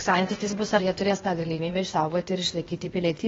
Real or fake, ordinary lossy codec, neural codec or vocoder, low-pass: fake; AAC, 24 kbps; codec, 16 kHz, 0.8 kbps, ZipCodec; 7.2 kHz